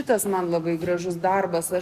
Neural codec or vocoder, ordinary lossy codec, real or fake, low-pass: none; Opus, 64 kbps; real; 14.4 kHz